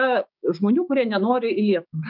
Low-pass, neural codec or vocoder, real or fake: 5.4 kHz; codec, 16 kHz, 6 kbps, DAC; fake